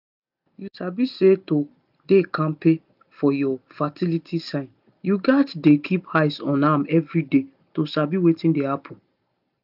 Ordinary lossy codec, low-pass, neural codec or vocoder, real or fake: none; 5.4 kHz; none; real